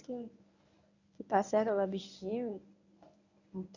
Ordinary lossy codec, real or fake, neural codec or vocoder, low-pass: none; fake; codec, 24 kHz, 0.9 kbps, WavTokenizer, medium speech release version 1; 7.2 kHz